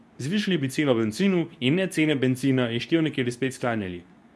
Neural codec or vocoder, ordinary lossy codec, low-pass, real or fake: codec, 24 kHz, 0.9 kbps, WavTokenizer, medium speech release version 2; none; none; fake